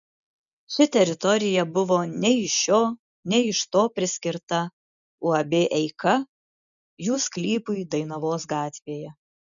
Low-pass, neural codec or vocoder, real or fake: 7.2 kHz; none; real